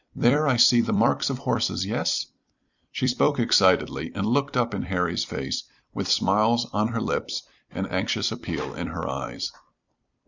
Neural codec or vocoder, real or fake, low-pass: vocoder, 44.1 kHz, 128 mel bands every 256 samples, BigVGAN v2; fake; 7.2 kHz